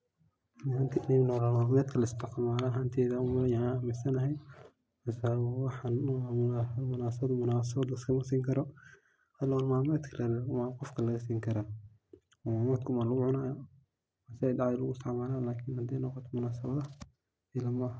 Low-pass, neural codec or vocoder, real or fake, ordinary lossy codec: none; none; real; none